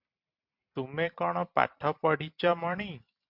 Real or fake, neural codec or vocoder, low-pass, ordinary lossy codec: fake; vocoder, 44.1 kHz, 128 mel bands every 256 samples, BigVGAN v2; 5.4 kHz; MP3, 48 kbps